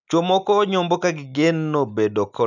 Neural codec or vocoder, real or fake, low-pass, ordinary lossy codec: none; real; 7.2 kHz; none